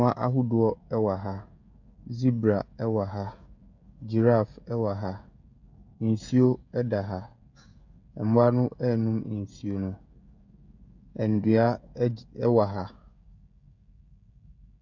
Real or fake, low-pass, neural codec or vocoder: fake; 7.2 kHz; codec, 16 kHz, 16 kbps, FreqCodec, smaller model